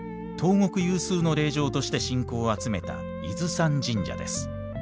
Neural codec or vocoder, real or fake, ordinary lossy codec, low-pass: none; real; none; none